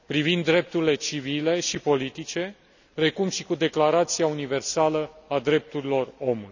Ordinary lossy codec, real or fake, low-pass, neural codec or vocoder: none; real; 7.2 kHz; none